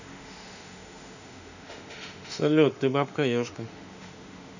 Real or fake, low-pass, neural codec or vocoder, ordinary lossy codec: fake; 7.2 kHz; autoencoder, 48 kHz, 32 numbers a frame, DAC-VAE, trained on Japanese speech; none